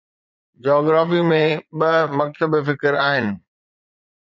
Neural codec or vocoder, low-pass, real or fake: vocoder, 22.05 kHz, 80 mel bands, Vocos; 7.2 kHz; fake